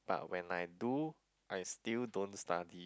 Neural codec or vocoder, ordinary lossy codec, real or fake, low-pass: none; none; real; none